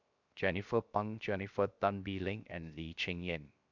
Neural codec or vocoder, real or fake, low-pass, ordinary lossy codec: codec, 16 kHz, 0.7 kbps, FocalCodec; fake; 7.2 kHz; none